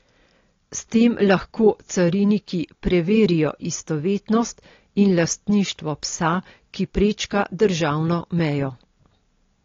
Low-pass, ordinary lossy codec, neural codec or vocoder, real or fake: 7.2 kHz; AAC, 32 kbps; none; real